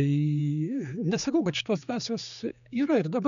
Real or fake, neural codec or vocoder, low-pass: fake; codec, 16 kHz, 4 kbps, X-Codec, HuBERT features, trained on general audio; 7.2 kHz